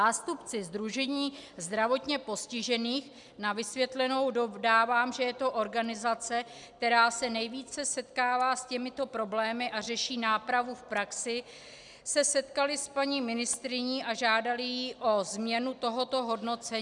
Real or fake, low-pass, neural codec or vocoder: real; 10.8 kHz; none